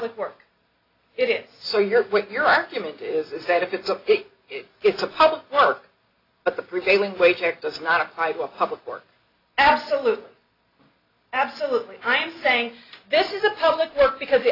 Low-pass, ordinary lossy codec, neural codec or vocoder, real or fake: 5.4 kHz; AAC, 32 kbps; none; real